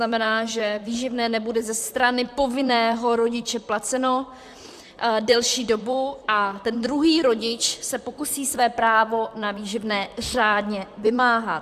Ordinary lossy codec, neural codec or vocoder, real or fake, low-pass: AAC, 96 kbps; vocoder, 44.1 kHz, 128 mel bands, Pupu-Vocoder; fake; 14.4 kHz